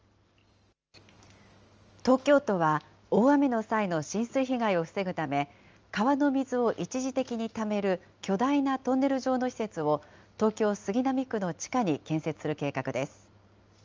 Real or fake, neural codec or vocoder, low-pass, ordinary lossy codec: real; none; 7.2 kHz; Opus, 24 kbps